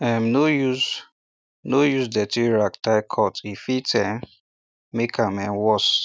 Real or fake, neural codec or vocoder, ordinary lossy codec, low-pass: real; none; none; none